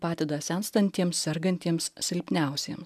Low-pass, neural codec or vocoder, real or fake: 14.4 kHz; none; real